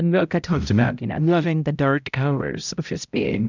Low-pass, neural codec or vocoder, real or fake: 7.2 kHz; codec, 16 kHz, 0.5 kbps, X-Codec, HuBERT features, trained on balanced general audio; fake